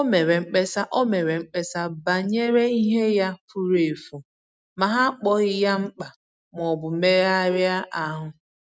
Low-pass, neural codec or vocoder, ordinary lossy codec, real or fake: none; none; none; real